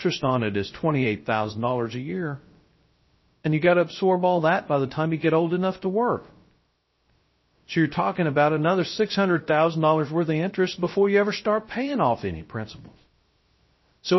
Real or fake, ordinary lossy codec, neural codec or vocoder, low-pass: fake; MP3, 24 kbps; codec, 16 kHz, 0.3 kbps, FocalCodec; 7.2 kHz